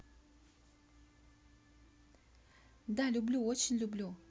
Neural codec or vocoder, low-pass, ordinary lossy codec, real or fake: none; none; none; real